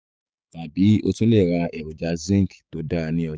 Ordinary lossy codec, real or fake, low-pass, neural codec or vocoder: none; fake; none; codec, 16 kHz, 6 kbps, DAC